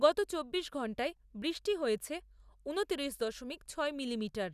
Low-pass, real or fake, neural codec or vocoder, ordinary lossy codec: 14.4 kHz; real; none; none